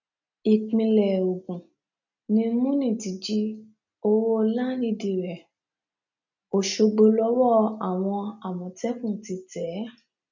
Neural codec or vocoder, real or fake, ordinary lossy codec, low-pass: none; real; none; 7.2 kHz